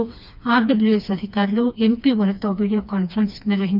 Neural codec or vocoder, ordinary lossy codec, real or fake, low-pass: codec, 16 kHz, 2 kbps, FreqCodec, smaller model; none; fake; 5.4 kHz